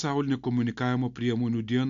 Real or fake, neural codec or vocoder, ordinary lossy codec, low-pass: real; none; MP3, 64 kbps; 7.2 kHz